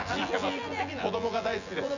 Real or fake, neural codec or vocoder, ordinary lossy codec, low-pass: fake; vocoder, 24 kHz, 100 mel bands, Vocos; none; 7.2 kHz